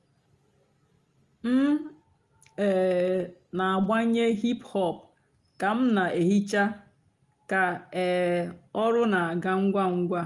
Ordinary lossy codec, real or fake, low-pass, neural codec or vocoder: Opus, 32 kbps; real; 10.8 kHz; none